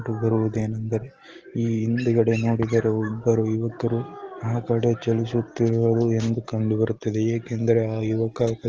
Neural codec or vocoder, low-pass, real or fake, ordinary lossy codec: none; 7.2 kHz; real; Opus, 16 kbps